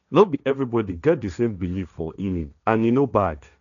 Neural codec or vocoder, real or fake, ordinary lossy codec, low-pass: codec, 16 kHz, 1.1 kbps, Voila-Tokenizer; fake; none; 7.2 kHz